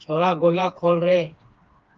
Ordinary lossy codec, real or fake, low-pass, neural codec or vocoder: Opus, 32 kbps; fake; 7.2 kHz; codec, 16 kHz, 2 kbps, FreqCodec, smaller model